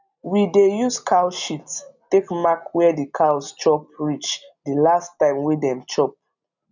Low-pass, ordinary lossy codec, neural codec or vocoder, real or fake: 7.2 kHz; none; none; real